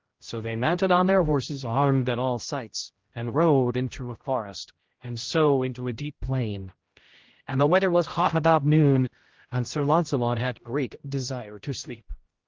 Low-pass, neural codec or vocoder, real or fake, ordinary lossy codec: 7.2 kHz; codec, 16 kHz, 0.5 kbps, X-Codec, HuBERT features, trained on general audio; fake; Opus, 16 kbps